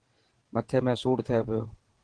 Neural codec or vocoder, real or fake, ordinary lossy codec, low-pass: vocoder, 22.05 kHz, 80 mel bands, WaveNeXt; fake; Opus, 16 kbps; 9.9 kHz